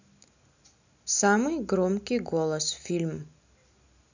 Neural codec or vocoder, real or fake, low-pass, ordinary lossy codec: none; real; 7.2 kHz; none